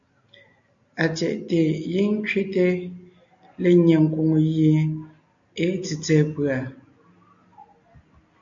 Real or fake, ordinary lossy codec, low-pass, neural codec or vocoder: real; AAC, 64 kbps; 7.2 kHz; none